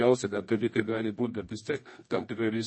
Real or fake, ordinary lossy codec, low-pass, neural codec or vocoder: fake; MP3, 32 kbps; 9.9 kHz; codec, 24 kHz, 0.9 kbps, WavTokenizer, medium music audio release